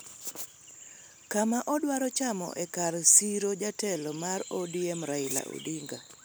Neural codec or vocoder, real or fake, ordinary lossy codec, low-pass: none; real; none; none